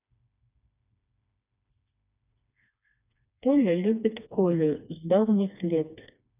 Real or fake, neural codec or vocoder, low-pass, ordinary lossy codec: fake; codec, 16 kHz, 2 kbps, FreqCodec, smaller model; 3.6 kHz; none